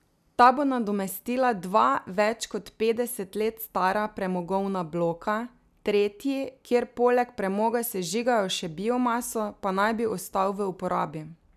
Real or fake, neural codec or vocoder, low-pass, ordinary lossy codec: real; none; 14.4 kHz; none